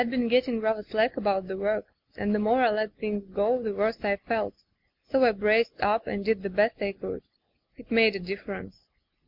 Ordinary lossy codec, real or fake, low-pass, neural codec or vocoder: MP3, 48 kbps; real; 5.4 kHz; none